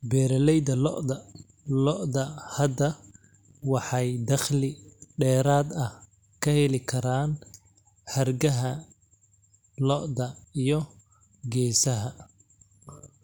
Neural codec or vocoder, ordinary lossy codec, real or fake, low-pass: none; none; real; none